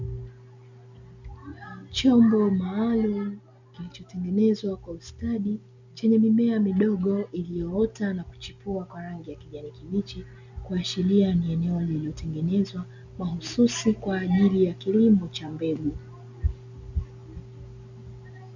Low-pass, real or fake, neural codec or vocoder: 7.2 kHz; real; none